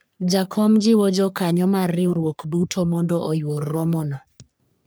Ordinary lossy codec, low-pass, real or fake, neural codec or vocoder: none; none; fake; codec, 44.1 kHz, 3.4 kbps, Pupu-Codec